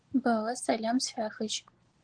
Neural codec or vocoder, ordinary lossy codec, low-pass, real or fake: none; Opus, 16 kbps; 9.9 kHz; real